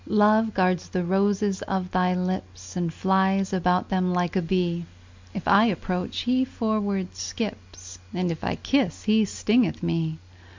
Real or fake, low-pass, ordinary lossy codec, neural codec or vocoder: real; 7.2 kHz; MP3, 64 kbps; none